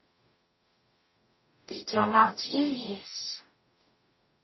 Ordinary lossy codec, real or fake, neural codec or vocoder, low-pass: MP3, 24 kbps; fake; codec, 44.1 kHz, 0.9 kbps, DAC; 7.2 kHz